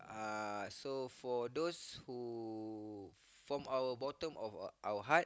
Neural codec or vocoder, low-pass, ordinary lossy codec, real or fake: none; none; none; real